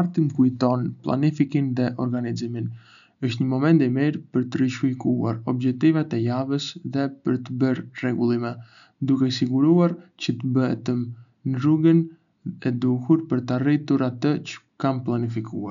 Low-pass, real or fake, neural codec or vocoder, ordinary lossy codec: 7.2 kHz; real; none; none